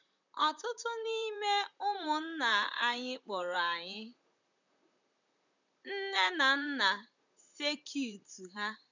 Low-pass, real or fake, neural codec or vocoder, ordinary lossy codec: 7.2 kHz; fake; vocoder, 44.1 kHz, 128 mel bands, Pupu-Vocoder; none